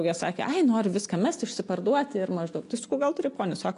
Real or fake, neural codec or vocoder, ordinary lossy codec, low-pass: real; none; AAC, 48 kbps; 10.8 kHz